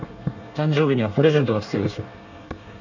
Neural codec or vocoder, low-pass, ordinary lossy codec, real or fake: codec, 24 kHz, 1 kbps, SNAC; 7.2 kHz; none; fake